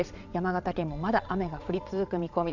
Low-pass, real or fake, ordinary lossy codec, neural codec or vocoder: 7.2 kHz; fake; none; vocoder, 22.05 kHz, 80 mel bands, Vocos